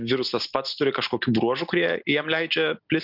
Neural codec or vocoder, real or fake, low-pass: none; real; 5.4 kHz